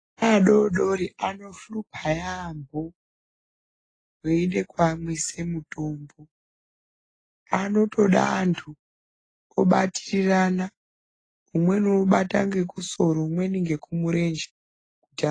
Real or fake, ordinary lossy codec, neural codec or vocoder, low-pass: real; AAC, 32 kbps; none; 9.9 kHz